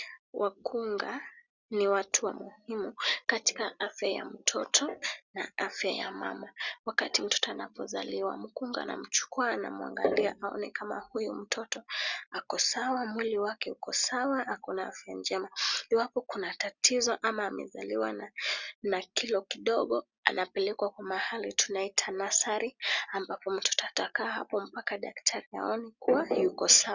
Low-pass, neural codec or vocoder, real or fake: 7.2 kHz; none; real